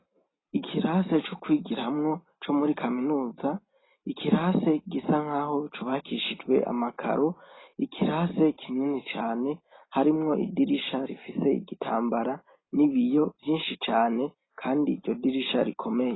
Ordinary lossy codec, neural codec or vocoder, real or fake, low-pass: AAC, 16 kbps; none; real; 7.2 kHz